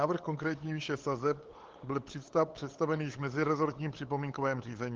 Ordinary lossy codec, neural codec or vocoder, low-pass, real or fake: Opus, 16 kbps; codec, 16 kHz, 8 kbps, FunCodec, trained on LibriTTS, 25 frames a second; 7.2 kHz; fake